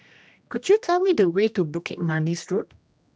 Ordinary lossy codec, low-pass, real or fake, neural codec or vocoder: none; none; fake; codec, 16 kHz, 1 kbps, X-Codec, HuBERT features, trained on general audio